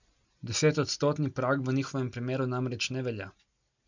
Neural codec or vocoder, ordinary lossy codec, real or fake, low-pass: none; none; real; 7.2 kHz